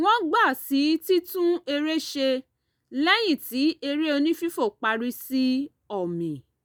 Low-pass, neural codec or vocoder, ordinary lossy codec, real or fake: none; none; none; real